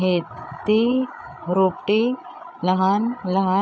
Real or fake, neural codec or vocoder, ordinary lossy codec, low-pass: fake; codec, 16 kHz, 16 kbps, FreqCodec, larger model; none; none